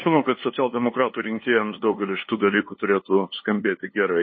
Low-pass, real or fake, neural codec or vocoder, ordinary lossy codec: 7.2 kHz; fake; codec, 16 kHz, 2 kbps, FunCodec, trained on LibriTTS, 25 frames a second; MP3, 24 kbps